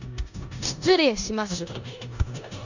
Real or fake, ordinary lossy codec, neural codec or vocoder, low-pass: fake; none; codec, 16 kHz in and 24 kHz out, 0.9 kbps, LongCat-Audio-Codec, four codebook decoder; 7.2 kHz